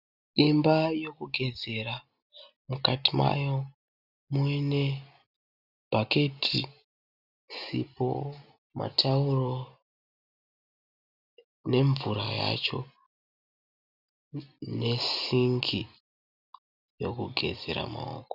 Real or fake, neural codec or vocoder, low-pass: real; none; 5.4 kHz